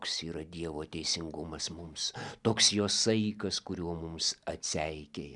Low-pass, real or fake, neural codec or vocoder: 10.8 kHz; real; none